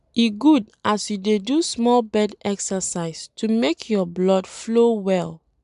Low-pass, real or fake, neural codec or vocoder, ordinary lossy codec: 10.8 kHz; real; none; none